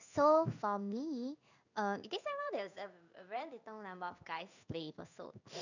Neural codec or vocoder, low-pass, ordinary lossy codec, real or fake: codec, 16 kHz in and 24 kHz out, 1 kbps, XY-Tokenizer; 7.2 kHz; none; fake